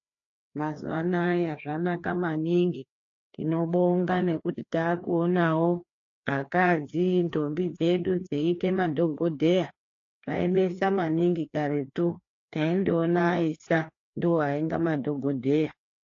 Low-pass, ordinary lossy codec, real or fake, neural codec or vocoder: 7.2 kHz; AAC, 48 kbps; fake; codec, 16 kHz, 2 kbps, FreqCodec, larger model